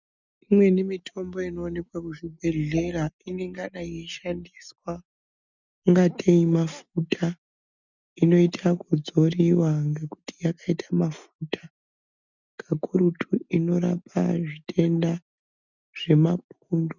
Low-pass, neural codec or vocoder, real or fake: 7.2 kHz; none; real